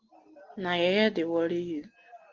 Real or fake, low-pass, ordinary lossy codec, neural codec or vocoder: real; 7.2 kHz; Opus, 16 kbps; none